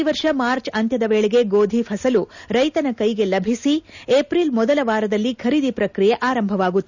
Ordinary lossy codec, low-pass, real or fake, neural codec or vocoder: none; 7.2 kHz; real; none